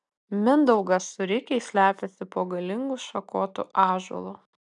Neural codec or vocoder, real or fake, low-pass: none; real; 10.8 kHz